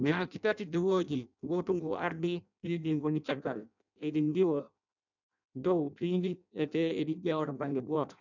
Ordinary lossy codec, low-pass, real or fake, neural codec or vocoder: none; 7.2 kHz; fake; codec, 16 kHz in and 24 kHz out, 0.6 kbps, FireRedTTS-2 codec